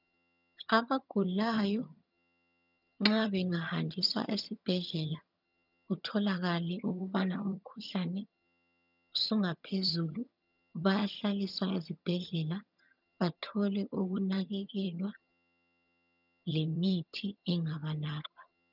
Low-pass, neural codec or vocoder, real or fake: 5.4 kHz; vocoder, 22.05 kHz, 80 mel bands, HiFi-GAN; fake